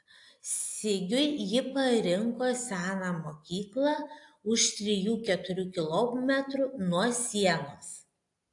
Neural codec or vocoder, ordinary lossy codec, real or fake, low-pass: none; AAC, 64 kbps; real; 10.8 kHz